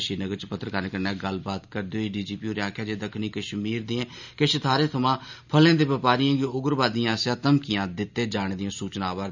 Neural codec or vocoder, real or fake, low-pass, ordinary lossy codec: none; real; 7.2 kHz; none